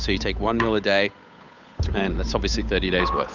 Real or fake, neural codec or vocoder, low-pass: fake; codec, 16 kHz, 8 kbps, FunCodec, trained on Chinese and English, 25 frames a second; 7.2 kHz